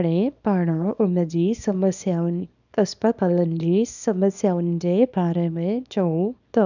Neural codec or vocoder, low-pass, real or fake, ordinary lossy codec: codec, 24 kHz, 0.9 kbps, WavTokenizer, small release; 7.2 kHz; fake; none